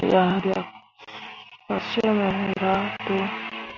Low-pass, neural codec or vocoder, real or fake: 7.2 kHz; none; real